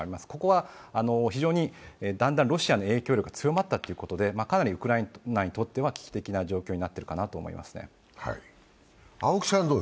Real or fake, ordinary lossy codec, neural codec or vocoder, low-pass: real; none; none; none